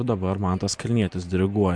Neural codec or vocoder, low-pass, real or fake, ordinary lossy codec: vocoder, 44.1 kHz, 128 mel bands every 512 samples, BigVGAN v2; 9.9 kHz; fake; MP3, 64 kbps